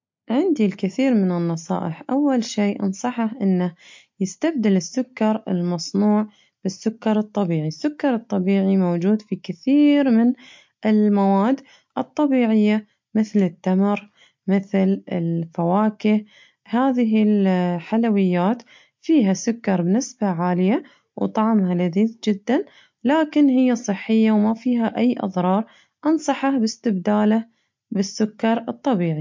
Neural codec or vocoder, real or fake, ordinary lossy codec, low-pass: none; real; MP3, 48 kbps; 7.2 kHz